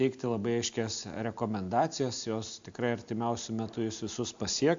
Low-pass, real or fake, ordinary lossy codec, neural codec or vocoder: 7.2 kHz; real; AAC, 64 kbps; none